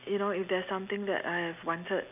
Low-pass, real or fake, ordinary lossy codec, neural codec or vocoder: 3.6 kHz; real; none; none